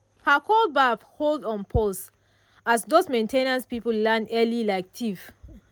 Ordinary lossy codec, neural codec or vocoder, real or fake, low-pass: none; none; real; none